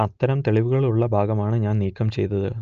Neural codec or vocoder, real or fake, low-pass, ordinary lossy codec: none; real; 7.2 kHz; Opus, 24 kbps